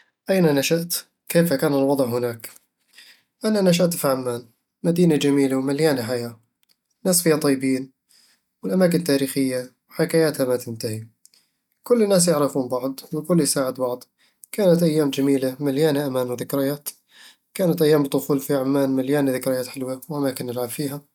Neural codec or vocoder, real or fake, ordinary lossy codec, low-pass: none; real; none; 19.8 kHz